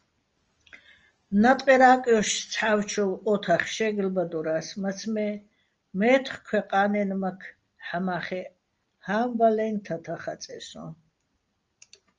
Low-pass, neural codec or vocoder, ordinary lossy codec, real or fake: 7.2 kHz; none; Opus, 24 kbps; real